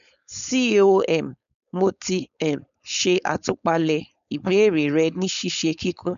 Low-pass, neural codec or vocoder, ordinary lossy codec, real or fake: 7.2 kHz; codec, 16 kHz, 4.8 kbps, FACodec; none; fake